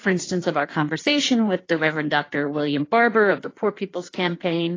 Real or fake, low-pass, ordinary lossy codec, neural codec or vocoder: fake; 7.2 kHz; AAC, 32 kbps; codec, 16 kHz in and 24 kHz out, 1.1 kbps, FireRedTTS-2 codec